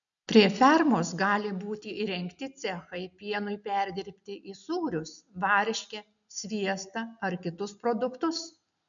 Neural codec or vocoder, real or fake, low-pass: none; real; 7.2 kHz